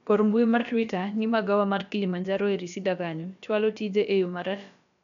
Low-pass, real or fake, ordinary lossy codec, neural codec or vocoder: 7.2 kHz; fake; none; codec, 16 kHz, about 1 kbps, DyCAST, with the encoder's durations